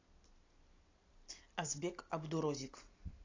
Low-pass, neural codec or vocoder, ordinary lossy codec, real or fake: 7.2 kHz; none; AAC, 32 kbps; real